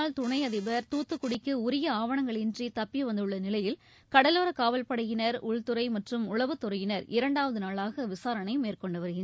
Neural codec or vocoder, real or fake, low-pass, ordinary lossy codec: none; real; none; none